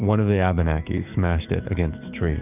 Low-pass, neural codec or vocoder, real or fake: 3.6 kHz; codec, 44.1 kHz, 7.8 kbps, DAC; fake